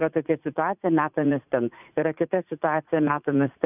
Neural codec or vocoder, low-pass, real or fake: vocoder, 44.1 kHz, 80 mel bands, Vocos; 3.6 kHz; fake